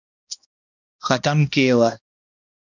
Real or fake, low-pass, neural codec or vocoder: fake; 7.2 kHz; codec, 16 kHz, 1 kbps, X-Codec, HuBERT features, trained on balanced general audio